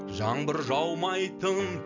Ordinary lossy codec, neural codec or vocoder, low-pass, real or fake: none; none; 7.2 kHz; real